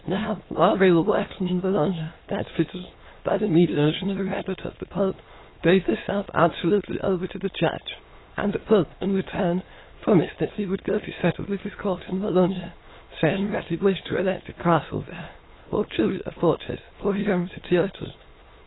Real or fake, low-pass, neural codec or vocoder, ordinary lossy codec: fake; 7.2 kHz; autoencoder, 22.05 kHz, a latent of 192 numbers a frame, VITS, trained on many speakers; AAC, 16 kbps